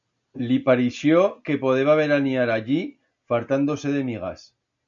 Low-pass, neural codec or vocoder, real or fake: 7.2 kHz; none; real